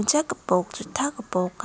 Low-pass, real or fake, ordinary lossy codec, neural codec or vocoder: none; real; none; none